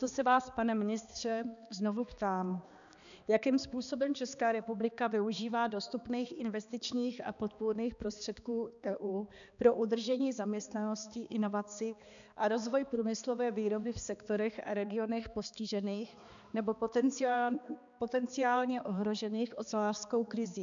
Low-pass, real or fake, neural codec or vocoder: 7.2 kHz; fake; codec, 16 kHz, 2 kbps, X-Codec, HuBERT features, trained on balanced general audio